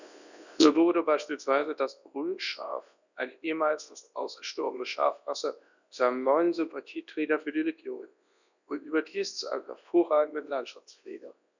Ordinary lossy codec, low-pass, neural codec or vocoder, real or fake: none; 7.2 kHz; codec, 24 kHz, 0.9 kbps, WavTokenizer, large speech release; fake